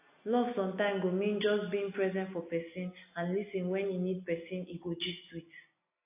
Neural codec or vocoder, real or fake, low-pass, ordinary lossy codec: none; real; 3.6 kHz; AAC, 24 kbps